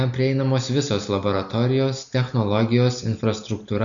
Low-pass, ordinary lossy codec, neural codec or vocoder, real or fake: 7.2 kHz; MP3, 96 kbps; none; real